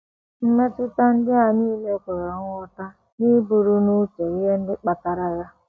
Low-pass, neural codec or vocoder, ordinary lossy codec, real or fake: none; none; none; real